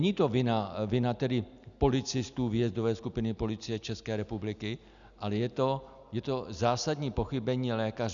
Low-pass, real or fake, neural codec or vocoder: 7.2 kHz; real; none